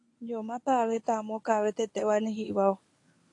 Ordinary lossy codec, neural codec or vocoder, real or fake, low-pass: AAC, 48 kbps; codec, 24 kHz, 0.9 kbps, WavTokenizer, medium speech release version 1; fake; 10.8 kHz